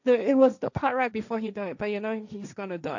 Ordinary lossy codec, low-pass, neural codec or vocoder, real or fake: none; 7.2 kHz; codec, 16 kHz, 1.1 kbps, Voila-Tokenizer; fake